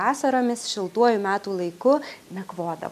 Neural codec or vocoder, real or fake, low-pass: none; real; 14.4 kHz